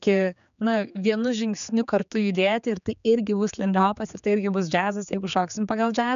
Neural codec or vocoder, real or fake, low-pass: codec, 16 kHz, 4 kbps, X-Codec, HuBERT features, trained on general audio; fake; 7.2 kHz